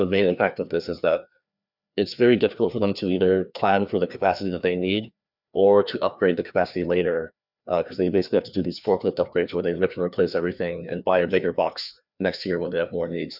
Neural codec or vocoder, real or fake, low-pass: codec, 16 kHz, 2 kbps, FreqCodec, larger model; fake; 5.4 kHz